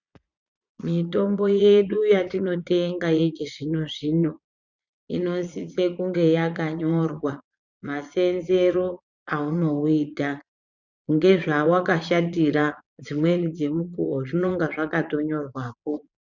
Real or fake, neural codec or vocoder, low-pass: fake; vocoder, 22.05 kHz, 80 mel bands, Vocos; 7.2 kHz